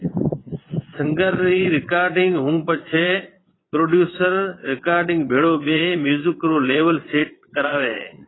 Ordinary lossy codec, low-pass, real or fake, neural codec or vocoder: AAC, 16 kbps; 7.2 kHz; fake; vocoder, 22.05 kHz, 80 mel bands, Vocos